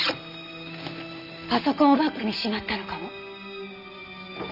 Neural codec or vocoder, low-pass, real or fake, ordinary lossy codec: none; 5.4 kHz; real; none